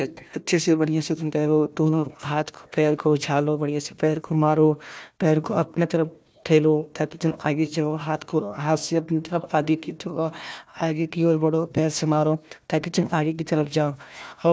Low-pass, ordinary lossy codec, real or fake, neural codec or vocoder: none; none; fake; codec, 16 kHz, 1 kbps, FunCodec, trained on Chinese and English, 50 frames a second